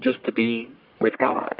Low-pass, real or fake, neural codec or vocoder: 5.4 kHz; fake; codec, 44.1 kHz, 1.7 kbps, Pupu-Codec